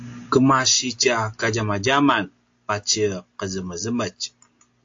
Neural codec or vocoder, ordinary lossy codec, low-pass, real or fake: none; AAC, 48 kbps; 7.2 kHz; real